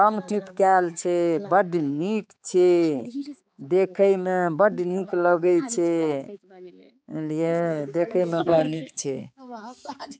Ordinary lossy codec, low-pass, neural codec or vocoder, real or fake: none; none; codec, 16 kHz, 4 kbps, X-Codec, HuBERT features, trained on balanced general audio; fake